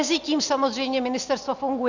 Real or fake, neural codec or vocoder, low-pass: real; none; 7.2 kHz